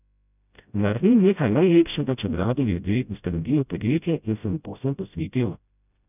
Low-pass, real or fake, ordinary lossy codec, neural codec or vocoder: 3.6 kHz; fake; none; codec, 16 kHz, 0.5 kbps, FreqCodec, smaller model